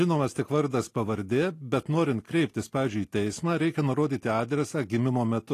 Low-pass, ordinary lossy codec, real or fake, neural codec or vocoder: 14.4 kHz; AAC, 48 kbps; real; none